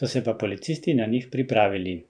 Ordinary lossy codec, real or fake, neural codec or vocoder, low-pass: none; fake; vocoder, 44.1 kHz, 128 mel bands every 512 samples, BigVGAN v2; 9.9 kHz